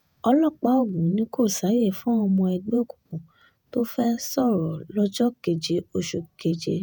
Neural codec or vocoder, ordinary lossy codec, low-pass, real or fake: vocoder, 48 kHz, 128 mel bands, Vocos; none; none; fake